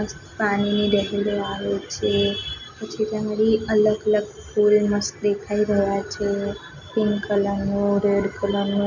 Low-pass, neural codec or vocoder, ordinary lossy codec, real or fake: 7.2 kHz; none; none; real